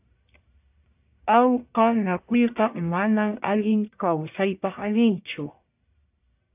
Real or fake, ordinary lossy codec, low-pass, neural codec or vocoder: fake; AAC, 32 kbps; 3.6 kHz; codec, 44.1 kHz, 1.7 kbps, Pupu-Codec